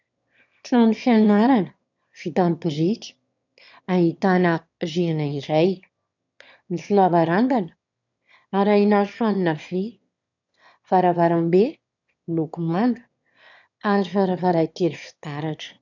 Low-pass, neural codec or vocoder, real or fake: 7.2 kHz; autoencoder, 22.05 kHz, a latent of 192 numbers a frame, VITS, trained on one speaker; fake